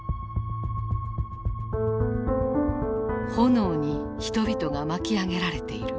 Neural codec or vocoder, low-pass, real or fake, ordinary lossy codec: none; none; real; none